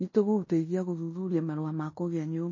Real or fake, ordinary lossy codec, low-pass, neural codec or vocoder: fake; MP3, 32 kbps; 7.2 kHz; codec, 16 kHz in and 24 kHz out, 0.9 kbps, LongCat-Audio-Codec, fine tuned four codebook decoder